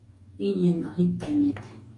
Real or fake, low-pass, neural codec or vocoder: fake; 10.8 kHz; codec, 44.1 kHz, 2.6 kbps, DAC